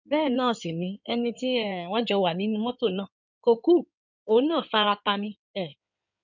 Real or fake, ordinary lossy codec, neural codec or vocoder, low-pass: fake; none; codec, 16 kHz in and 24 kHz out, 2.2 kbps, FireRedTTS-2 codec; 7.2 kHz